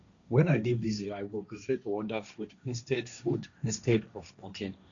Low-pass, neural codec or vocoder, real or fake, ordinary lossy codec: 7.2 kHz; codec, 16 kHz, 1.1 kbps, Voila-Tokenizer; fake; none